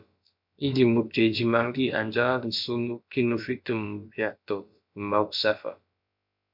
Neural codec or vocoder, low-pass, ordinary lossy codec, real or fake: codec, 16 kHz, about 1 kbps, DyCAST, with the encoder's durations; 5.4 kHz; MP3, 48 kbps; fake